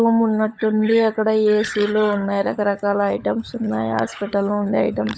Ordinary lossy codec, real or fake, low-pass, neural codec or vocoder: none; fake; none; codec, 16 kHz, 16 kbps, FunCodec, trained on LibriTTS, 50 frames a second